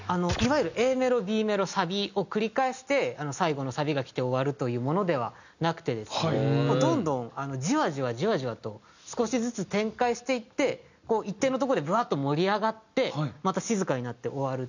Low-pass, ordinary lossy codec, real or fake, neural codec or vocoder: 7.2 kHz; none; real; none